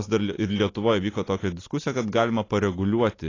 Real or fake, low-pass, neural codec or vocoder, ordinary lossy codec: real; 7.2 kHz; none; AAC, 32 kbps